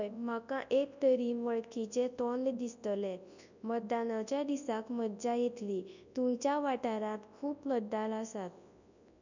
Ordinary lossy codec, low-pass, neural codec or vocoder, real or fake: none; 7.2 kHz; codec, 24 kHz, 0.9 kbps, WavTokenizer, large speech release; fake